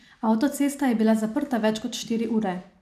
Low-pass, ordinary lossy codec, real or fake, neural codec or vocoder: 14.4 kHz; none; real; none